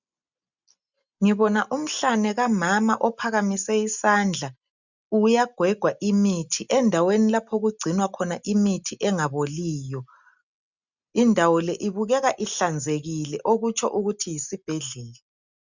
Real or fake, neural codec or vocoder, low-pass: real; none; 7.2 kHz